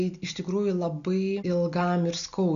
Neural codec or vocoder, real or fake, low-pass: none; real; 7.2 kHz